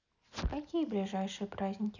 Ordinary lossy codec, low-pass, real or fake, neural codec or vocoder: none; 7.2 kHz; real; none